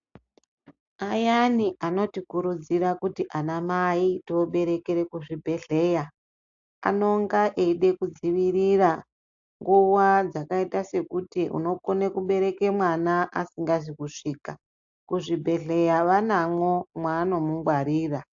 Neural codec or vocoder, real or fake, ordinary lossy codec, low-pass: none; real; AAC, 64 kbps; 7.2 kHz